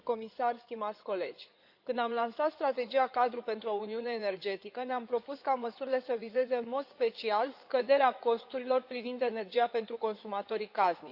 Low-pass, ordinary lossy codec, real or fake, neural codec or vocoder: 5.4 kHz; Opus, 64 kbps; fake; codec, 16 kHz in and 24 kHz out, 2.2 kbps, FireRedTTS-2 codec